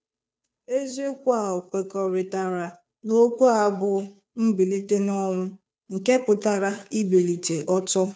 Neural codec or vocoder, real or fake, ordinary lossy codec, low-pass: codec, 16 kHz, 2 kbps, FunCodec, trained on Chinese and English, 25 frames a second; fake; none; none